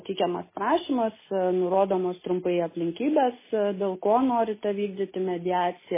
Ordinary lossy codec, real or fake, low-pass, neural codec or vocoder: MP3, 16 kbps; real; 3.6 kHz; none